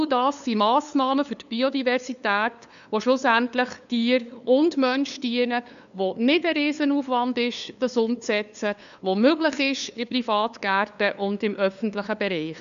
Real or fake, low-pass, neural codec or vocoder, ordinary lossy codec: fake; 7.2 kHz; codec, 16 kHz, 2 kbps, FunCodec, trained on LibriTTS, 25 frames a second; none